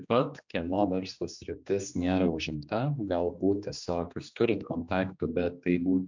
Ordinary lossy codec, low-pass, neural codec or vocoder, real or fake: MP3, 64 kbps; 7.2 kHz; codec, 16 kHz, 2 kbps, X-Codec, HuBERT features, trained on general audio; fake